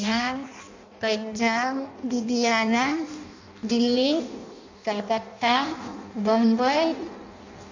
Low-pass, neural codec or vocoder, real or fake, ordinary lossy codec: 7.2 kHz; codec, 16 kHz in and 24 kHz out, 0.6 kbps, FireRedTTS-2 codec; fake; AAC, 48 kbps